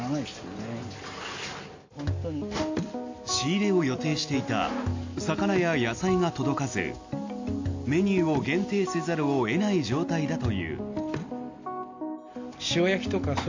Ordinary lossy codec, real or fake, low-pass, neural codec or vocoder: AAC, 48 kbps; real; 7.2 kHz; none